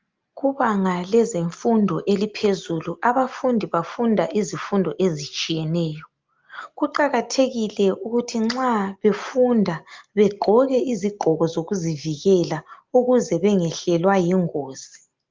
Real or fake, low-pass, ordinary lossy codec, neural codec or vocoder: real; 7.2 kHz; Opus, 32 kbps; none